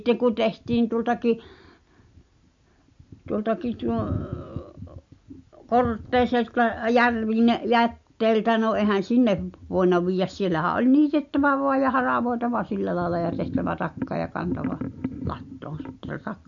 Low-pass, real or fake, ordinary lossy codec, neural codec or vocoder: 7.2 kHz; real; MP3, 48 kbps; none